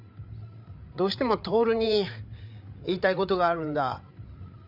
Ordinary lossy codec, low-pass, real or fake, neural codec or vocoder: none; 5.4 kHz; fake; codec, 16 kHz, 8 kbps, FreqCodec, larger model